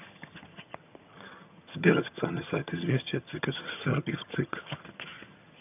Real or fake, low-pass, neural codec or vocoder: fake; 3.6 kHz; vocoder, 22.05 kHz, 80 mel bands, HiFi-GAN